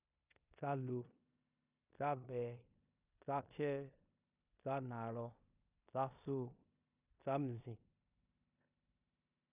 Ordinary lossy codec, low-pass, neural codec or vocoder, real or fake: none; 3.6 kHz; codec, 16 kHz in and 24 kHz out, 0.9 kbps, LongCat-Audio-Codec, four codebook decoder; fake